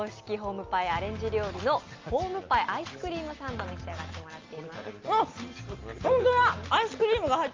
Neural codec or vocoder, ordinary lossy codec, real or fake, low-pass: none; Opus, 24 kbps; real; 7.2 kHz